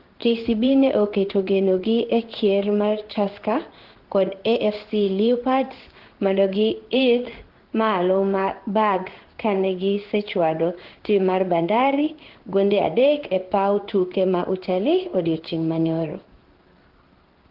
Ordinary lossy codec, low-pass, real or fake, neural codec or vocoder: Opus, 16 kbps; 5.4 kHz; fake; codec, 16 kHz in and 24 kHz out, 1 kbps, XY-Tokenizer